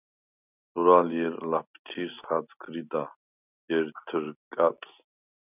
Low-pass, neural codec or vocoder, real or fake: 3.6 kHz; none; real